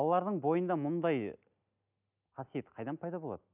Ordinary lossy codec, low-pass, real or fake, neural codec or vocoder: none; 3.6 kHz; real; none